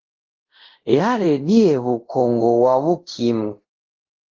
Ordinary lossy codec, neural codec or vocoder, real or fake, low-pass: Opus, 16 kbps; codec, 24 kHz, 0.5 kbps, DualCodec; fake; 7.2 kHz